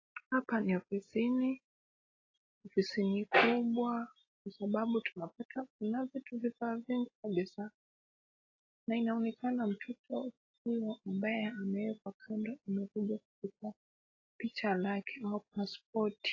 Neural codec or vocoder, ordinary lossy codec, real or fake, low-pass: none; AAC, 32 kbps; real; 7.2 kHz